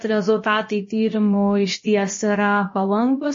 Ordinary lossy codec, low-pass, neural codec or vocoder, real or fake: MP3, 32 kbps; 7.2 kHz; codec, 16 kHz, about 1 kbps, DyCAST, with the encoder's durations; fake